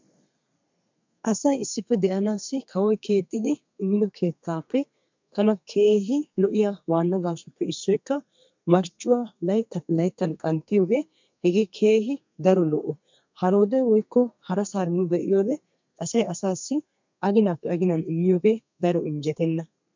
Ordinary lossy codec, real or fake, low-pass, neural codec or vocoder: MP3, 64 kbps; fake; 7.2 kHz; codec, 32 kHz, 1.9 kbps, SNAC